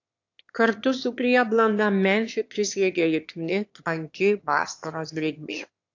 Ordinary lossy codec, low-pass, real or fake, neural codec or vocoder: AAC, 48 kbps; 7.2 kHz; fake; autoencoder, 22.05 kHz, a latent of 192 numbers a frame, VITS, trained on one speaker